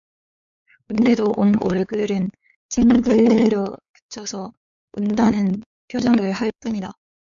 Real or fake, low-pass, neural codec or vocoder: fake; 7.2 kHz; codec, 16 kHz, 4 kbps, X-Codec, WavLM features, trained on Multilingual LibriSpeech